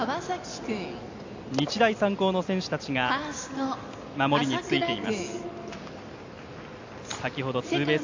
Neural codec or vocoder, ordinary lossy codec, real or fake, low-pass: none; AAC, 48 kbps; real; 7.2 kHz